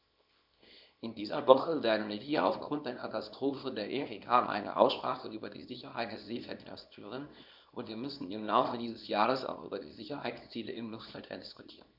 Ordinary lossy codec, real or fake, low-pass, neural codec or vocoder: none; fake; 5.4 kHz; codec, 24 kHz, 0.9 kbps, WavTokenizer, small release